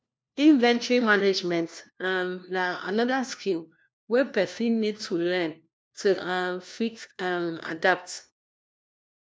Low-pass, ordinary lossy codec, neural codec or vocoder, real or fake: none; none; codec, 16 kHz, 1 kbps, FunCodec, trained on LibriTTS, 50 frames a second; fake